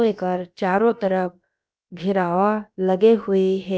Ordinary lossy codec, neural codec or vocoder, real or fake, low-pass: none; codec, 16 kHz, about 1 kbps, DyCAST, with the encoder's durations; fake; none